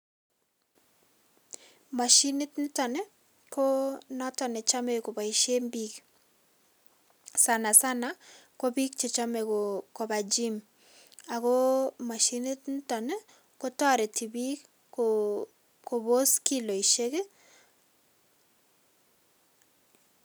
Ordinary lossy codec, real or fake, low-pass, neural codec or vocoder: none; real; none; none